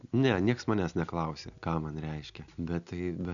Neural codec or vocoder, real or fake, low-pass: none; real; 7.2 kHz